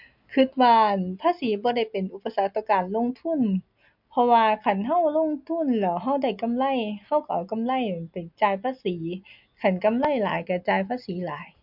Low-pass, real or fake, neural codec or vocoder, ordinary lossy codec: 5.4 kHz; real; none; MP3, 48 kbps